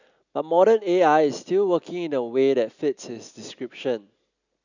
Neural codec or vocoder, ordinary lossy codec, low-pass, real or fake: none; none; 7.2 kHz; real